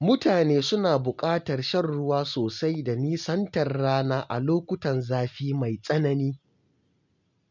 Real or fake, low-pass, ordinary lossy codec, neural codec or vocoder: real; 7.2 kHz; none; none